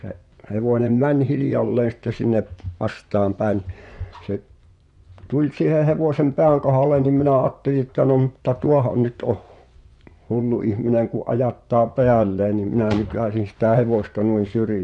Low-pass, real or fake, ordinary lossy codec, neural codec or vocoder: 10.8 kHz; fake; none; vocoder, 24 kHz, 100 mel bands, Vocos